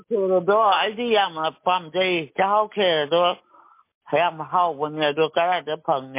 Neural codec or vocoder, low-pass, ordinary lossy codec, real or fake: none; 3.6 kHz; MP3, 24 kbps; real